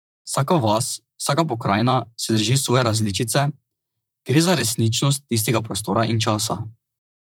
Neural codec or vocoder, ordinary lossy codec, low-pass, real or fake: vocoder, 44.1 kHz, 128 mel bands, Pupu-Vocoder; none; none; fake